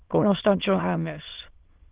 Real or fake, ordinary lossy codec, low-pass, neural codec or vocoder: fake; Opus, 16 kbps; 3.6 kHz; autoencoder, 22.05 kHz, a latent of 192 numbers a frame, VITS, trained on many speakers